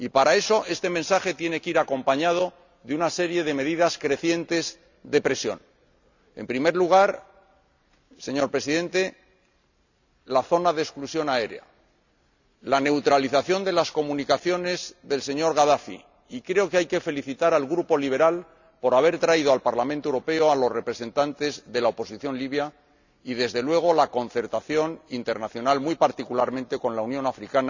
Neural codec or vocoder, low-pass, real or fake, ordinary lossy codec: none; 7.2 kHz; real; none